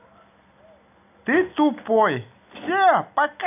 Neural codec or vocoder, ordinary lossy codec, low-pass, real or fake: none; none; 3.6 kHz; real